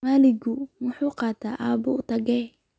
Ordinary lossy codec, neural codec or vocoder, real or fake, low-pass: none; none; real; none